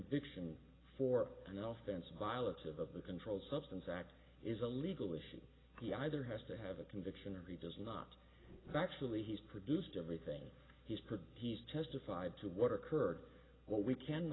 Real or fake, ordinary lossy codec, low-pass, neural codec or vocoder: real; AAC, 16 kbps; 7.2 kHz; none